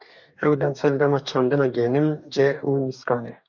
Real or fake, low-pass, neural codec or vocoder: fake; 7.2 kHz; codec, 44.1 kHz, 2.6 kbps, DAC